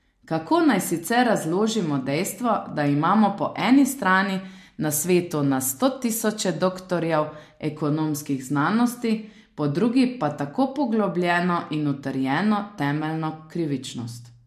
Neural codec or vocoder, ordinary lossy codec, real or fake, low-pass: none; MP3, 64 kbps; real; 14.4 kHz